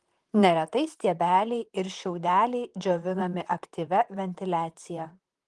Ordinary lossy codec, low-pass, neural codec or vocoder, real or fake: Opus, 24 kbps; 10.8 kHz; vocoder, 44.1 kHz, 128 mel bands, Pupu-Vocoder; fake